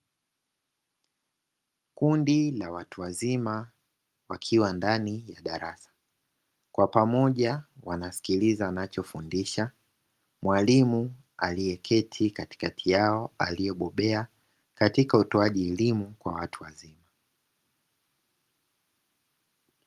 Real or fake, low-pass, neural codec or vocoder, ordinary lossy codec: real; 14.4 kHz; none; Opus, 32 kbps